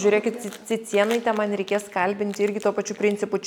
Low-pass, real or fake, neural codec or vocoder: 19.8 kHz; real; none